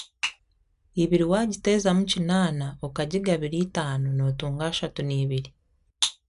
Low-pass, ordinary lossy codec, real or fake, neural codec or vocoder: 10.8 kHz; none; real; none